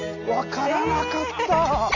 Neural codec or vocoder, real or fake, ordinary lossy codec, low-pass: none; real; none; 7.2 kHz